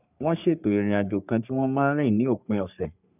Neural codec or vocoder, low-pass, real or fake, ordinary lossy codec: codec, 44.1 kHz, 3.4 kbps, Pupu-Codec; 3.6 kHz; fake; none